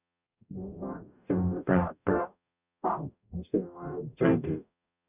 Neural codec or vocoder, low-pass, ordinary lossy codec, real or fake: codec, 44.1 kHz, 0.9 kbps, DAC; 3.6 kHz; none; fake